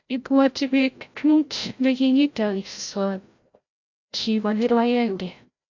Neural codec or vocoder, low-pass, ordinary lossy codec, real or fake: codec, 16 kHz, 0.5 kbps, FreqCodec, larger model; 7.2 kHz; AAC, 48 kbps; fake